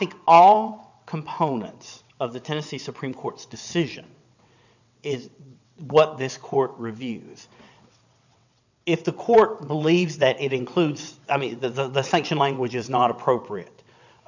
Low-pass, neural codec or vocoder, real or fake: 7.2 kHz; vocoder, 22.05 kHz, 80 mel bands, WaveNeXt; fake